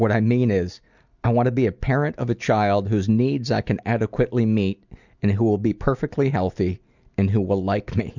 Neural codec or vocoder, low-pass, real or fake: none; 7.2 kHz; real